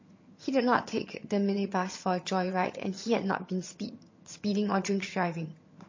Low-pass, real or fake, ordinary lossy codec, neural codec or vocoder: 7.2 kHz; fake; MP3, 32 kbps; vocoder, 22.05 kHz, 80 mel bands, HiFi-GAN